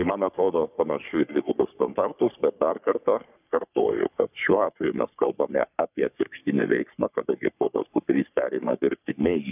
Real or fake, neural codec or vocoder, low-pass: fake; codec, 44.1 kHz, 3.4 kbps, Pupu-Codec; 3.6 kHz